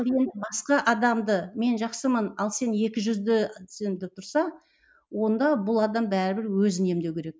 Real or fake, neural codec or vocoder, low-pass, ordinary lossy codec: real; none; none; none